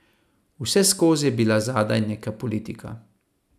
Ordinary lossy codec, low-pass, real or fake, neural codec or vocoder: none; 14.4 kHz; real; none